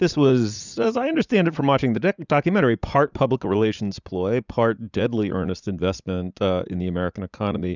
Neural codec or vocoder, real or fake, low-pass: vocoder, 44.1 kHz, 80 mel bands, Vocos; fake; 7.2 kHz